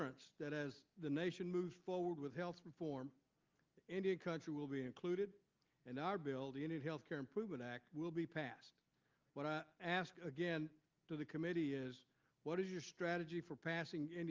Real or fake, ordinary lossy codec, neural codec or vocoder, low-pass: real; Opus, 24 kbps; none; 7.2 kHz